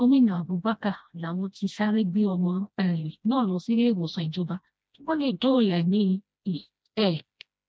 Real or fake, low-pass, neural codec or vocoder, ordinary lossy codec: fake; none; codec, 16 kHz, 1 kbps, FreqCodec, smaller model; none